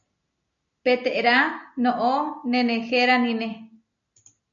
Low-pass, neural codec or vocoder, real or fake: 7.2 kHz; none; real